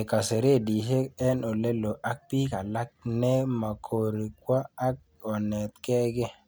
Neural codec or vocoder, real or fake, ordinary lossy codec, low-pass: none; real; none; none